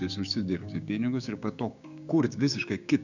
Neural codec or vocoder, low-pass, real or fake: codec, 16 kHz, 6 kbps, DAC; 7.2 kHz; fake